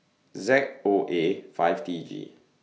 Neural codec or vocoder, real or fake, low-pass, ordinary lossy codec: none; real; none; none